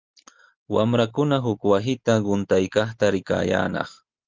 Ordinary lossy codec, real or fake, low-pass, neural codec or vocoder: Opus, 32 kbps; real; 7.2 kHz; none